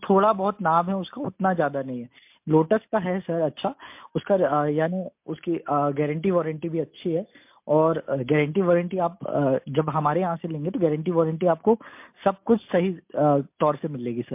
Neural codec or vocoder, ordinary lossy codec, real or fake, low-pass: none; MP3, 32 kbps; real; 3.6 kHz